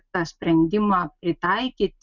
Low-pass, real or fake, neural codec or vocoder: 7.2 kHz; real; none